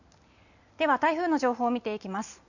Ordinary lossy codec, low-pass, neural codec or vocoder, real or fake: none; 7.2 kHz; none; real